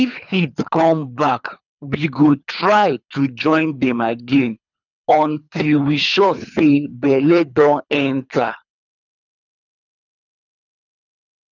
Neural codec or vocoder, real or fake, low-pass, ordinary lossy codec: codec, 24 kHz, 3 kbps, HILCodec; fake; 7.2 kHz; none